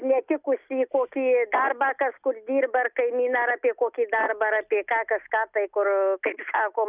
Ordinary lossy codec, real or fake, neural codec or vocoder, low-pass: Opus, 64 kbps; real; none; 3.6 kHz